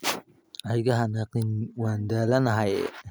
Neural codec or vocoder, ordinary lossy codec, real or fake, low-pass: none; none; real; none